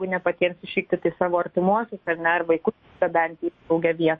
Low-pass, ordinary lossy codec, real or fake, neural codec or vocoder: 10.8 kHz; MP3, 32 kbps; fake; autoencoder, 48 kHz, 128 numbers a frame, DAC-VAE, trained on Japanese speech